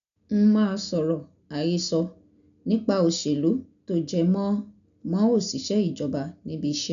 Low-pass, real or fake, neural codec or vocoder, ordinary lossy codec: 7.2 kHz; real; none; none